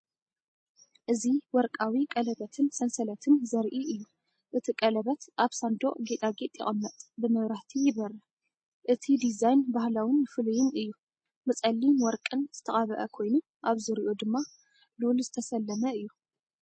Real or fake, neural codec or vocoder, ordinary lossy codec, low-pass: real; none; MP3, 32 kbps; 9.9 kHz